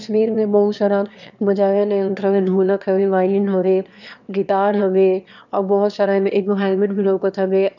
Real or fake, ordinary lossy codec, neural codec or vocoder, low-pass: fake; none; autoencoder, 22.05 kHz, a latent of 192 numbers a frame, VITS, trained on one speaker; 7.2 kHz